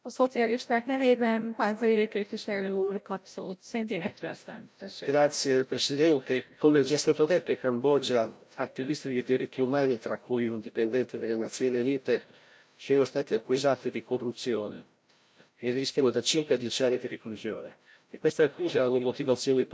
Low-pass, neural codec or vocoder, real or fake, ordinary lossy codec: none; codec, 16 kHz, 0.5 kbps, FreqCodec, larger model; fake; none